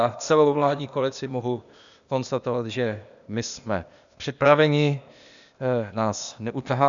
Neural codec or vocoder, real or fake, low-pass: codec, 16 kHz, 0.8 kbps, ZipCodec; fake; 7.2 kHz